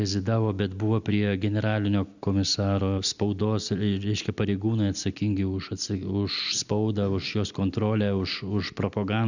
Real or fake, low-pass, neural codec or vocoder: real; 7.2 kHz; none